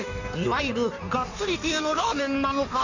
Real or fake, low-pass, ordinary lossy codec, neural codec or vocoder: fake; 7.2 kHz; none; codec, 16 kHz in and 24 kHz out, 1.1 kbps, FireRedTTS-2 codec